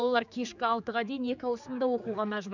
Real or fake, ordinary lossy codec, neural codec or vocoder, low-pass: fake; none; codec, 16 kHz, 4 kbps, X-Codec, HuBERT features, trained on general audio; 7.2 kHz